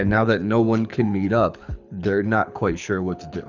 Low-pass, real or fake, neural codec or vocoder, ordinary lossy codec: 7.2 kHz; fake; codec, 24 kHz, 6 kbps, HILCodec; Opus, 64 kbps